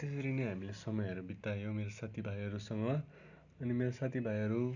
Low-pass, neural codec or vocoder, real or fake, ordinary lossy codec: 7.2 kHz; none; real; none